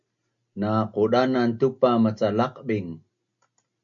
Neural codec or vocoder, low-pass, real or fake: none; 7.2 kHz; real